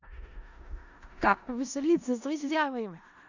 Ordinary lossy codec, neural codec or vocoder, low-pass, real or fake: none; codec, 16 kHz in and 24 kHz out, 0.4 kbps, LongCat-Audio-Codec, four codebook decoder; 7.2 kHz; fake